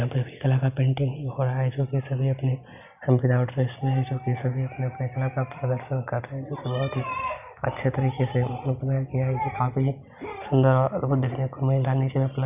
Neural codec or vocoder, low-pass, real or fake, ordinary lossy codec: none; 3.6 kHz; real; none